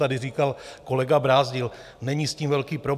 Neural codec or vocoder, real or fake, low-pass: none; real; 14.4 kHz